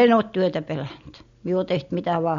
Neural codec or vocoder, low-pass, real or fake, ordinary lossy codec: none; 7.2 kHz; real; MP3, 48 kbps